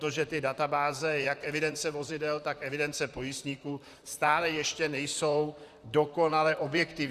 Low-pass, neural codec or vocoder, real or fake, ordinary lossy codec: 14.4 kHz; vocoder, 44.1 kHz, 128 mel bands, Pupu-Vocoder; fake; Opus, 64 kbps